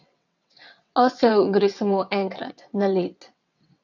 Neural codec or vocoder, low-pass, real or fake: vocoder, 22.05 kHz, 80 mel bands, WaveNeXt; 7.2 kHz; fake